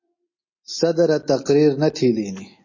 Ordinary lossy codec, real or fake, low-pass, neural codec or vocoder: MP3, 32 kbps; real; 7.2 kHz; none